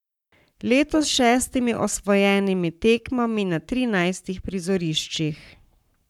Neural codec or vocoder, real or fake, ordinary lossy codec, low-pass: codec, 44.1 kHz, 7.8 kbps, Pupu-Codec; fake; none; 19.8 kHz